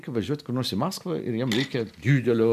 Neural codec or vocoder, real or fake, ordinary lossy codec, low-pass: none; real; MP3, 96 kbps; 14.4 kHz